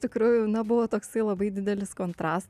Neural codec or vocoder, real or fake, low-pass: none; real; 14.4 kHz